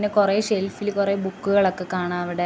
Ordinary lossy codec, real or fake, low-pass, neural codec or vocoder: none; real; none; none